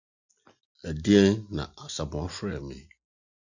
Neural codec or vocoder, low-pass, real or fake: none; 7.2 kHz; real